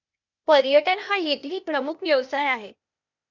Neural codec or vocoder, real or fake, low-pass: codec, 16 kHz, 0.8 kbps, ZipCodec; fake; 7.2 kHz